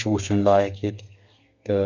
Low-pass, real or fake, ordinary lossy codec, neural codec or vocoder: 7.2 kHz; fake; none; codec, 32 kHz, 1.9 kbps, SNAC